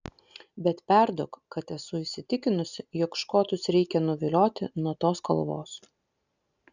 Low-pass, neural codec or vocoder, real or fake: 7.2 kHz; none; real